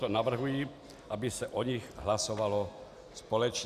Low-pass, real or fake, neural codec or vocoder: 14.4 kHz; real; none